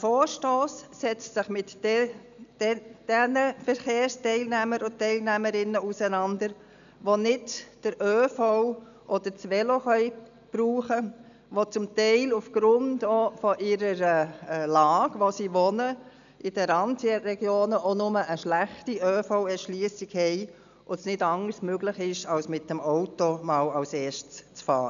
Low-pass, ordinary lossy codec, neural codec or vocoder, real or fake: 7.2 kHz; none; none; real